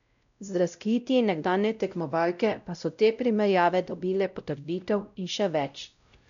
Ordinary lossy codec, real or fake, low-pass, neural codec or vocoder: none; fake; 7.2 kHz; codec, 16 kHz, 0.5 kbps, X-Codec, WavLM features, trained on Multilingual LibriSpeech